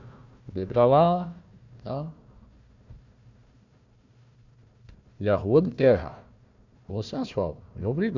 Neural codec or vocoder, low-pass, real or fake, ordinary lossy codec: codec, 16 kHz, 1 kbps, FunCodec, trained on Chinese and English, 50 frames a second; 7.2 kHz; fake; MP3, 64 kbps